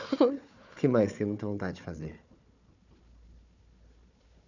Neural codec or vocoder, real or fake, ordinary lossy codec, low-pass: codec, 16 kHz, 4 kbps, FunCodec, trained on Chinese and English, 50 frames a second; fake; none; 7.2 kHz